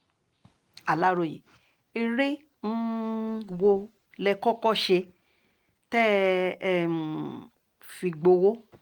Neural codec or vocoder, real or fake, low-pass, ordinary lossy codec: none; real; 19.8 kHz; Opus, 32 kbps